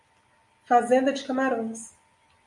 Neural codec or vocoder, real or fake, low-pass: none; real; 10.8 kHz